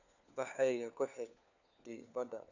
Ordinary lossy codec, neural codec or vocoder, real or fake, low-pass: none; codec, 16 kHz, 4 kbps, FunCodec, trained on LibriTTS, 50 frames a second; fake; 7.2 kHz